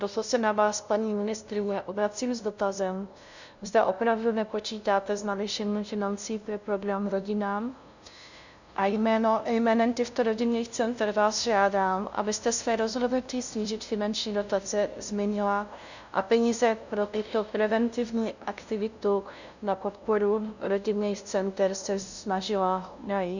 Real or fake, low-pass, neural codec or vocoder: fake; 7.2 kHz; codec, 16 kHz, 0.5 kbps, FunCodec, trained on LibriTTS, 25 frames a second